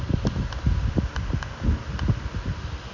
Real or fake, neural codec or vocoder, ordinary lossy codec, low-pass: real; none; none; 7.2 kHz